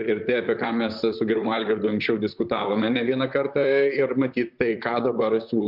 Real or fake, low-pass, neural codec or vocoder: fake; 5.4 kHz; vocoder, 44.1 kHz, 128 mel bands, Pupu-Vocoder